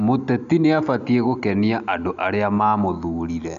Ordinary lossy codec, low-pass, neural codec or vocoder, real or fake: none; 7.2 kHz; none; real